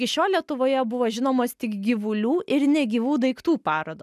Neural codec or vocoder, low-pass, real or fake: none; 14.4 kHz; real